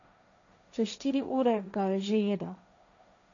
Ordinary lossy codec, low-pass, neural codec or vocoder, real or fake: none; 7.2 kHz; codec, 16 kHz, 1.1 kbps, Voila-Tokenizer; fake